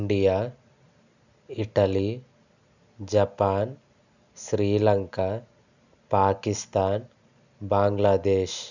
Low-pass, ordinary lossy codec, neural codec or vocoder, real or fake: 7.2 kHz; none; none; real